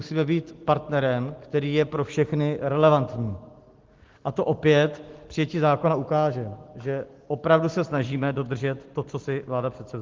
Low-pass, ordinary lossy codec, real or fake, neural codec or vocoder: 7.2 kHz; Opus, 16 kbps; real; none